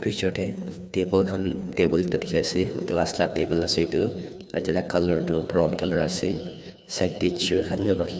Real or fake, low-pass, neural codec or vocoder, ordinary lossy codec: fake; none; codec, 16 kHz, 2 kbps, FreqCodec, larger model; none